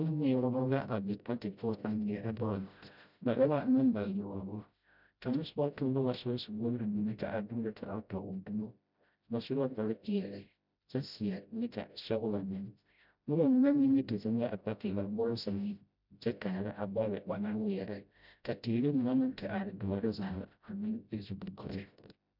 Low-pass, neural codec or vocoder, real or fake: 5.4 kHz; codec, 16 kHz, 0.5 kbps, FreqCodec, smaller model; fake